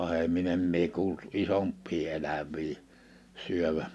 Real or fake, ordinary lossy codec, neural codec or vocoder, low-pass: real; none; none; none